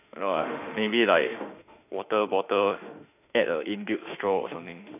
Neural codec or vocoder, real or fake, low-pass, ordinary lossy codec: autoencoder, 48 kHz, 32 numbers a frame, DAC-VAE, trained on Japanese speech; fake; 3.6 kHz; none